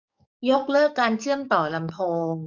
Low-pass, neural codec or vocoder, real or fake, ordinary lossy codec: 7.2 kHz; codec, 44.1 kHz, 7.8 kbps, DAC; fake; none